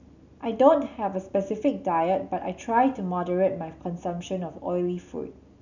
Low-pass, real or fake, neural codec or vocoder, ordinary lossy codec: 7.2 kHz; real; none; none